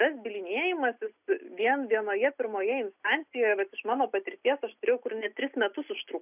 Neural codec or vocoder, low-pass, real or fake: none; 3.6 kHz; real